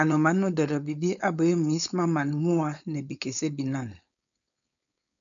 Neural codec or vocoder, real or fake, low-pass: codec, 16 kHz, 4.8 kbps, FACodec; fake; 7.2 kHz